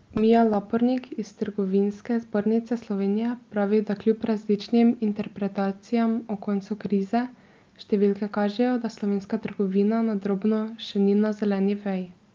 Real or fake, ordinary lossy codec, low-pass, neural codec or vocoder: real; Opus, 24 kbps; 7.2 kHz; none